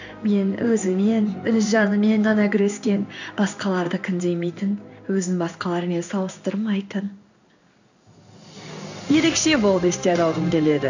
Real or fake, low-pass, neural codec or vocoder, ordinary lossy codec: fake; 7.2 kHz; codec, 16 kHz in and 24 kHz out, 1 kbps, XY-Tokenizer; none